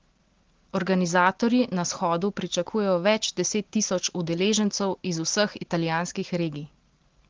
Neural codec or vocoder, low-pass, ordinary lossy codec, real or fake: none; 7.2 kHz; Opus, 16 kbps; real